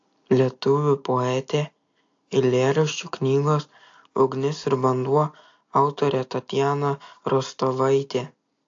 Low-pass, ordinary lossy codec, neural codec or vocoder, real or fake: 7.2 kHz; AAC, 48 kbps; none; real